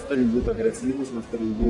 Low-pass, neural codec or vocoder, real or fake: 10.8 kHz; codec, 44.1 kHz, 1.7 kbps, Pupu-Codec; fake